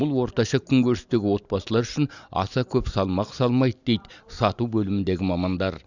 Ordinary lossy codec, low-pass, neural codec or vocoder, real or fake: none; 7.2 kHz; none; real